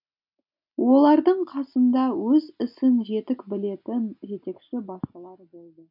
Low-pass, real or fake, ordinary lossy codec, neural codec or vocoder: 5.4 kHz; real; none; none